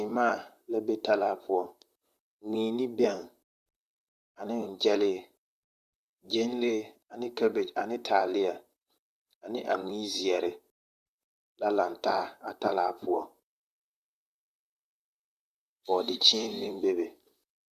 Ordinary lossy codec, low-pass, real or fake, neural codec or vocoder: Opus, 64 kbps; 14.4 kHz; fake; vocoder, 44.1 kHz, 128 mel bands, Pupu-Vocoder